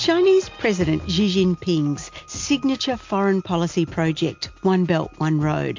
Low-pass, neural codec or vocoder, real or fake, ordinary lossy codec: 7.2 kHz; none; real; MP3, 48 kbps